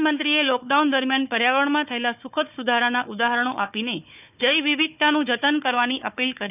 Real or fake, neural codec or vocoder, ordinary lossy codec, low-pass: fake; codec, 16 kHz, 4 kbps, FunCodec, trained on Chinese and English, 50 frames a second; none; 3.6 kHz